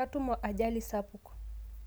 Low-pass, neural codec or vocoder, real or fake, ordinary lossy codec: none; none; real; none